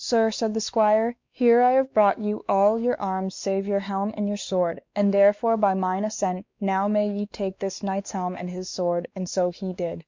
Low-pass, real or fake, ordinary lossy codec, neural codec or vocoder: 7.2 kHz; fake; MP3, 64 kbps; codec, 16 kHz, 2 kbps, X-Codec, WavLM features, trained on Multilingual LibriSpeech